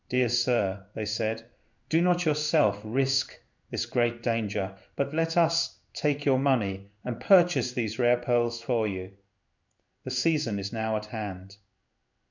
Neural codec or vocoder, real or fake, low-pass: codec, 16 kHz in and 24 kHz out, 1 kbps, XY-Tokenizer; fake; 7.2 kHz